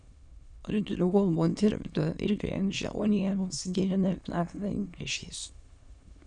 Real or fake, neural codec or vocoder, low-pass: fake; autoencoder, 22.05 kHz, a latent of 192 numbers a frame, VITS, trained on many speakers; 9.9 kHz